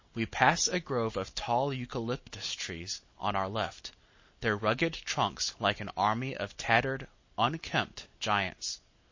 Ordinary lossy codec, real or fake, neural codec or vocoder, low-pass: MP3, 32 kbps; real; none; 7.2 kHz